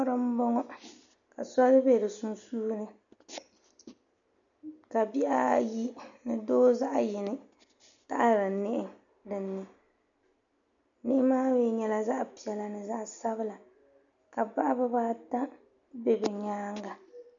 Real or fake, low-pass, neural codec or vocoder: real; 7.2 kHz; none